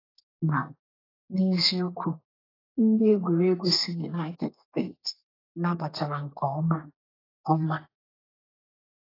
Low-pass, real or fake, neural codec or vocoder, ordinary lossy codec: 5.4 kHz; fake; codec, 32 kHz, 1.9 kbps, SNAC; AAC, 24 kbps